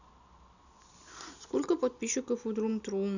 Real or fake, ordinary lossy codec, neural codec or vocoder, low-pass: real; none; none; 7.2 kHz